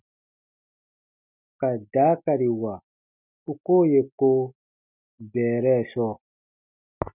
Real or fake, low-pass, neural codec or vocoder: real; 3.6 kHz; none